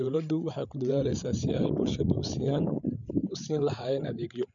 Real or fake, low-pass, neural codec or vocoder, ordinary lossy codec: fake; 7.2 kHz; codec, 16 kHz, 8 kbps, FreqCodec, larger model; none